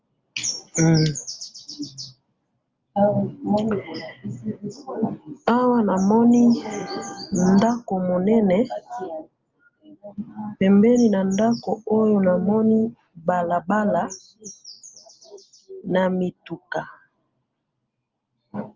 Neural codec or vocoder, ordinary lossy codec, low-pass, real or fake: none; Opus, 32 kbps; 7.2 kHz; real